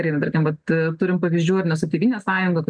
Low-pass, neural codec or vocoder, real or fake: 9.9 kHz; none; real